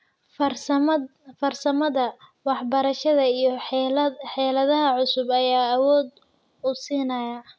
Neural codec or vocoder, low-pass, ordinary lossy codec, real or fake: none; none; none; real